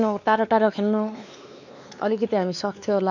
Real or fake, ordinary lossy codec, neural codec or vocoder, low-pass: fake; none; codec, 16 kHz, 2 kbps, X-Codec, WavLM features, trained on Multilingual LibriSpeech; 7.2 kHz